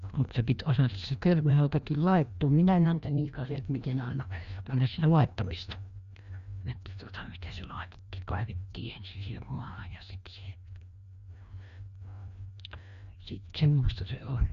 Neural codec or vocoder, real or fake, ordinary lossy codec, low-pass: codec, 16 kHz, 1 kbps, FreqCodec, larger model; fake; Opus, 64 kbps; 7.2 kHz